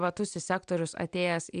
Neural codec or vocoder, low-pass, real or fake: none; 9.9 kHz; real